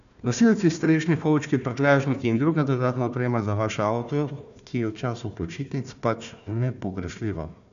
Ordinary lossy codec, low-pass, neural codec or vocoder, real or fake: MP3, 96 kbps; 7.2 kHz; codec, 16 kHz, 1 kbps, FunCodec, trained on Chinese and English, 50 frames a second; fake